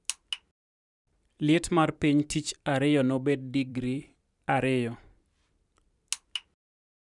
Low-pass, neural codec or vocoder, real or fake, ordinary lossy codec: 10.8 kHz; vocoder, 44.1 kHz, 128 mel bands every 512 samples, BigVGAN v2; fake; none